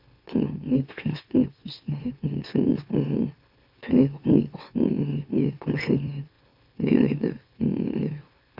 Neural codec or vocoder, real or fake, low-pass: autoencoder, 44.1 kHz, a latent of 192 numbers a frame, MeloTTS; fake; 5.4 kHz